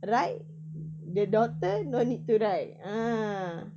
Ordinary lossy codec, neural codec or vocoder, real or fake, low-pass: none; none; real; none